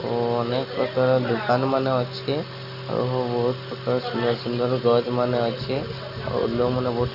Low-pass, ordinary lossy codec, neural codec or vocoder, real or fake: 5.4 kHz; none; none; real